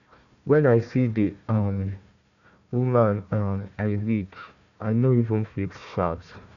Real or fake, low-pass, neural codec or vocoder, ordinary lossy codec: fake; 7.2 kHz; codec, 16 kHz, 1 kbps, FunCodec, trained on Chinese and English, 50 frames a second; none